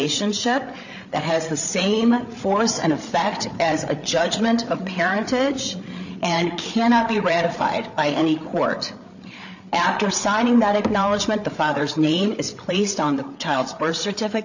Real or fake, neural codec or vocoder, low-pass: fake; codec, 16 kHz, 8 kbps, FreqCodec, larger model; 7.2 kHz